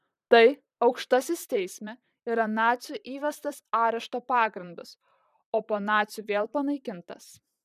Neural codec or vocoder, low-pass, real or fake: vocoder, 44.1 kHz, 128 mel bands, Pupu-Vocoder; 14.4 kHz; fake